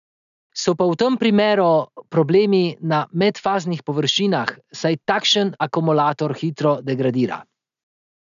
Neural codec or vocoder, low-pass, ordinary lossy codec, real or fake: none; 7.2 kHz; none; real